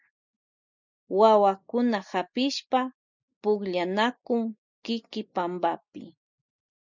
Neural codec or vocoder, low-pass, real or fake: none; 7.2 kHz; real